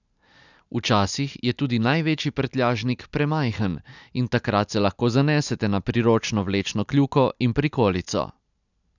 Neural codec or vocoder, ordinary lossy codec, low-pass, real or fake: none; none; 7.2 kHz; real